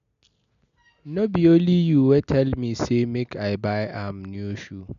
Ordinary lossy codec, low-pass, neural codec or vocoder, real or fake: none; 7.2 kHz; none; real